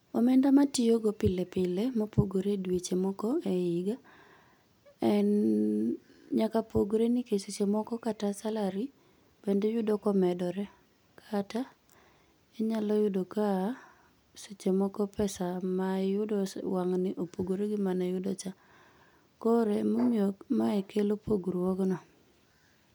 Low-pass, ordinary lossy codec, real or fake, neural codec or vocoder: none; none; real; none